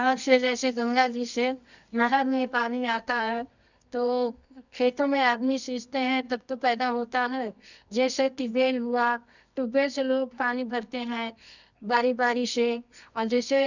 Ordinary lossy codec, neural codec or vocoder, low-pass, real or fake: none; codec, 24 kHz, 0.9 kbps, WavTokenizer, medium music audio release; 7.2 kHz; fake